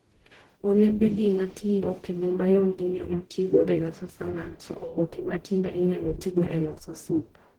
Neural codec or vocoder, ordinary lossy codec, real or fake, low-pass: codec, 44.1 kHz, 0.9 kbps, DAC; Opus, 16 kbps; fake; 19.8 kHz